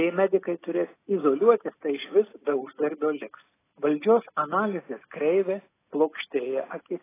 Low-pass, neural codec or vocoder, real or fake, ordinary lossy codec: 3.6 kHz; none; real; AAC, 16 kbps